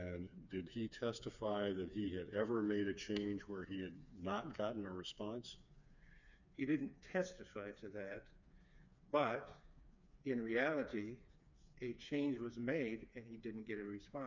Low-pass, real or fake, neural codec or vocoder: 7.2 kHz; fake; codec, 16 kHz, 4 kbps, FreqCodec, smaller model